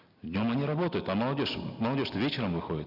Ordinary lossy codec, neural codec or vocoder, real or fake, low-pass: none; none; real; 5.4 kHz